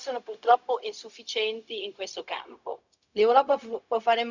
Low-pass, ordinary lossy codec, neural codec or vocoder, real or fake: 7.2 kHz; none; codec, 16 kHz, 0.4 kbps, LongCat-Audio-Codec; fake